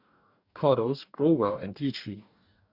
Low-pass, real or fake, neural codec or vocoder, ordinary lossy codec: 5.4 kHz; fake; codec, 44.1 kHz, 2.6 kbps, DAC; none